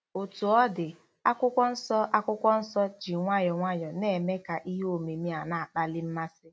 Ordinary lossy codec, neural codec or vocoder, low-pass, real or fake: none; none; none; real